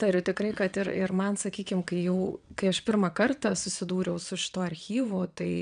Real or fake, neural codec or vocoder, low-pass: fake; vocoder, 22.05 kHz, 80 mel bands, WaveNeXt; 9.9 kHz